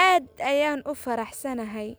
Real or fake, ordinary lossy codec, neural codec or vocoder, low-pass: real; none; none; none